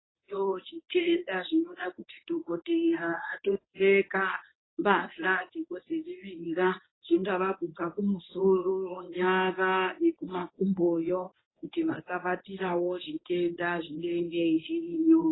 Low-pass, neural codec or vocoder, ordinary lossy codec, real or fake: 7.2 kHz; codec, 24 kHz, 0.9 kbps, WavTokenizer, medium speech release version 1; AAC, 16 kbps; fake